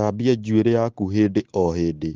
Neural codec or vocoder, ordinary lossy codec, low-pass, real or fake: none; Opus, 16 kbps; 7.2 kHz; real